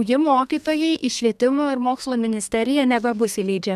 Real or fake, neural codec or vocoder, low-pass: fake; codec, 32 kHz, 1.9 kbps, SNAC; 14.4 kHz